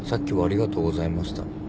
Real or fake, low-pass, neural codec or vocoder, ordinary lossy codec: real; none; none; none